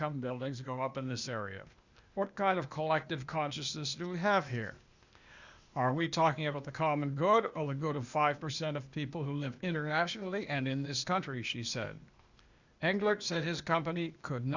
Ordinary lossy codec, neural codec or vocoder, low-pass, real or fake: Opus, 64 kbps; codec, 16 kHz, 0.8 kbps, ZipCodec; 7.2 kHz; fake